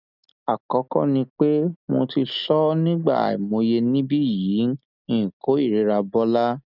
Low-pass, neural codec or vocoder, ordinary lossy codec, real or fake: 5.4 kHz; none; none; real